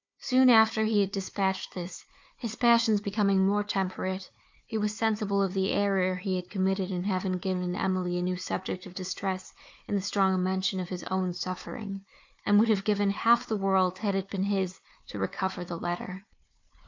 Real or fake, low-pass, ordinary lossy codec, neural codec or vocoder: fake; 7.2 kHz; MP3, 64 kbps; codec, 16 kHz, 4 kbps, FunCodec, trained on Chinese and English, 50 frames a second